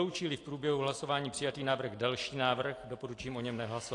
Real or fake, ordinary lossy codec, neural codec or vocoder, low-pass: real; AAC, 48 kbps; none; 10.8 kHz